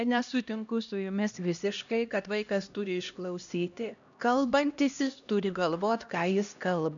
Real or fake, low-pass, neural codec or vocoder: fake; 7.2 kHz; codec, 16 kHz, 1 kbps, X-Codec, HuBERT features, trained on LibriSpeech